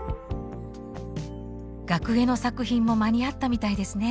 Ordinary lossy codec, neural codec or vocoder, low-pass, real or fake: none; none; none; real